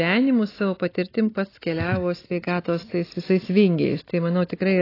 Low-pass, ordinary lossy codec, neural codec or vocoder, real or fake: 5.4 kHz; AAC, 24 kbps; none; real